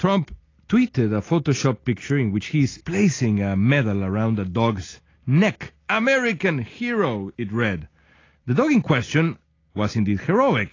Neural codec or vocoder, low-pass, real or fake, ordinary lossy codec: none; 7.2 kHz; real; AAC, 32 kbps